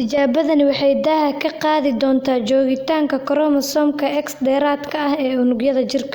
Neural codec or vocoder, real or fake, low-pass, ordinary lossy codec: none; real; 19.8 kHz; Opus, 64 kbps